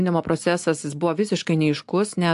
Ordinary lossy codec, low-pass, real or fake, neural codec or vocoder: AAC, 64 kbps; 10.8 kHz; real; none